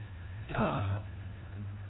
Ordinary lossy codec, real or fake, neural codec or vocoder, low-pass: AAC, 16 kbps; fake; codec, 16 kHz, 1 kbps, FunCodec, trained on LibriTTS, 50 frames a second; 7.2 kHz